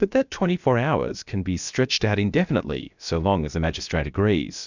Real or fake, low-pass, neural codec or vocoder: fake; 7.2 kHz; codec, 16 kHz, about 1 kbps, DyCAST, with the encoder's durations